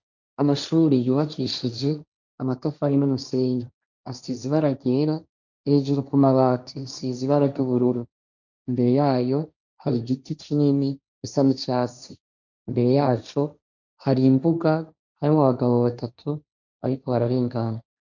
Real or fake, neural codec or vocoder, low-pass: fake; codec, 16 kHz, 1.1 kbps, Voila-Tokenizer; 7.2 kHz